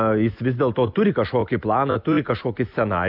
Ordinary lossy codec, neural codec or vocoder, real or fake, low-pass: AAC, 48 kbps; none; real; 5.4 kHz